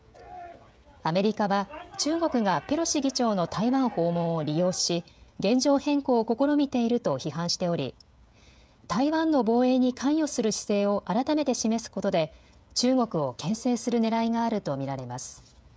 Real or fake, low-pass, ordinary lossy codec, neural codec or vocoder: fake; none; none; codec, 16 kHz, 16 kbps, FreqCodec, smaller model